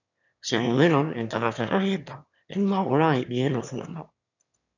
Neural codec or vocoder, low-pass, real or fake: autoencoder, 22.05 kHz, a latent of 192 numbers a frame, VITS, trained on one speaker; 7.2 kHz; fake